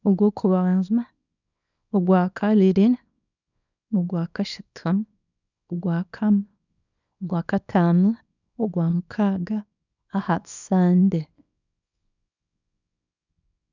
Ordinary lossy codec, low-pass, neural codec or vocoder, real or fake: none; 7.2 kHz; codec, 24 kHz, 0.9 kbps, WavTokenizer, small release; fake